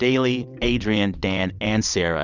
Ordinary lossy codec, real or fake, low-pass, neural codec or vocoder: Opus, 64 kbps; fake; 7.2 kHz; vocoder, 22.05 kHz, 80 mel bands, WaveNeXt